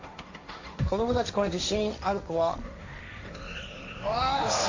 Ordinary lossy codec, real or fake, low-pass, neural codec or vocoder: AAC, 48 kbps; fake; 7.2 kHz; codec, 16 kHz, 1.1 kbps, Voila-Tokenizer